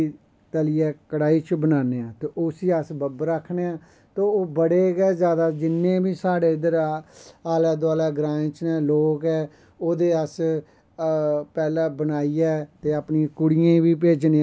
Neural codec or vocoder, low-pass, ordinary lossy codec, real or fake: none; none; none; real